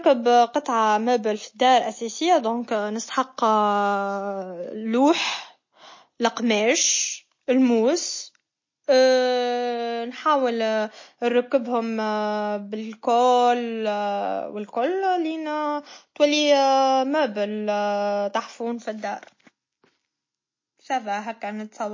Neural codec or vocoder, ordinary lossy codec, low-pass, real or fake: none; MP3, 32 kbps; 7.2 kHz; real